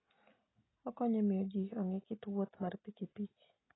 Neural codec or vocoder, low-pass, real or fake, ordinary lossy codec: none; 7.2 kHz; real; AAC, 16 kbps